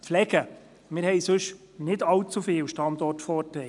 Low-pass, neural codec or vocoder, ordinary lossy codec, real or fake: 10.8 kHz; none; none; real